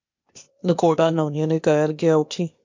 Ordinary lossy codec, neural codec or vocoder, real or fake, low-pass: MP3, 48 kbps; codec, 16 kHz, 0.8 kbps, ZipCodec; fake; 7.2 kHz